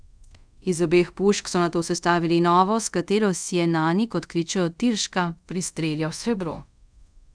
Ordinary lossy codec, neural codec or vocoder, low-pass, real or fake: none; codec, 24 kHz, 0.5 kbps, DualCodec; 9.9 kHz; fake